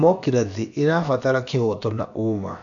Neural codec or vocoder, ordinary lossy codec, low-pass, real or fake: codec, 16 kHz, about 1 kbps, DyCAST, with the encoder's durations; none; 7.2 kHz; fake